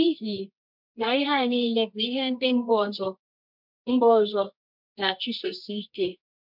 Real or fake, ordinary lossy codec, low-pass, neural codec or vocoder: fake; MP3, 48 kbps; 5.4 kHz; codec, 24 kHz, 0.9 kbps, WavTokenizer, medium music audio release